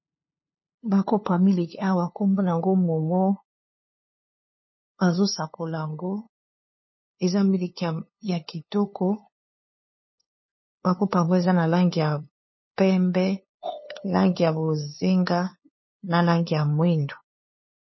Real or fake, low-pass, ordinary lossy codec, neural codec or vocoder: fake; 7.2 kHz; MP3, 24 kbps; codec, 16 kHz, 2 kbps, FunCodec, trained on LibriTTS, 25 frames a second